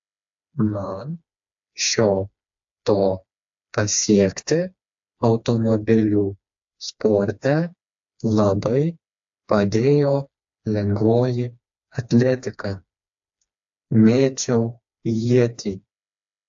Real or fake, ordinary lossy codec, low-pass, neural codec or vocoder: fake; AAC, 64 kbps; 7.2 kHz; codec, 16 kHz, 2 kbps, FreqCodec, smaller model